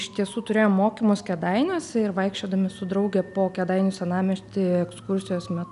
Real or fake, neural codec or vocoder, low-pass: real; none; 10.8 kHz